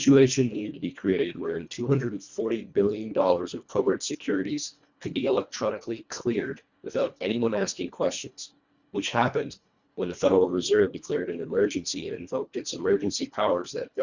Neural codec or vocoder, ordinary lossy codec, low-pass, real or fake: codec, 24 kHz, 1.5 kbps, HILCodec; Opus, 64 kbps; 7.2 kHz; fake